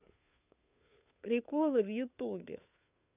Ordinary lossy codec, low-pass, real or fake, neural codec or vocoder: none; 3.6 kHz; fake; codec, 16 kHz, 2 kbps, FunCodec, trained on Chinese and English, 25 frames a second